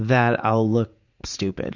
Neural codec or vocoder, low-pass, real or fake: vocoder, 44.1 kHz, 80 mel bands, Vocos; 7.2 kHz; fake